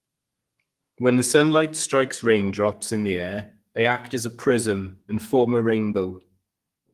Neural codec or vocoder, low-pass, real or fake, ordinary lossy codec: codec, 32 kHz, 1.9 kbps, SNAC; 14.4 kHz; fake; Opus, 24 kbps